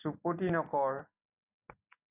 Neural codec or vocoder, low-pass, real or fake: none; 3.6 kHz; real